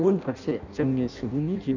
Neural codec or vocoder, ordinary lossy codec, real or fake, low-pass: codec, 16 kHz in and 24 kHz out, 0.6 kbps, FireRedTTS-2 codec; none; fake; 7.2 kHz